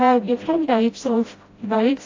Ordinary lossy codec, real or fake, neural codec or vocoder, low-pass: AAC, 32 kbps; fake; codec, 16 kHz, 0.5 kbps, FreqCodec, smaller model; 7.2 kHz